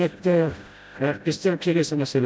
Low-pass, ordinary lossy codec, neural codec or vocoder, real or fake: none; none; codec, 16 kHz, 0.5 kbps, FreqCodec, smaller model; fake